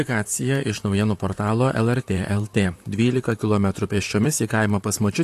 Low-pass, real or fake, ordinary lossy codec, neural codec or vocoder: 14.4 kHz; fake; AAC, 64 kbps; vocoder, 44.1 kHz, 128 mel bands, Pupu-Vocoder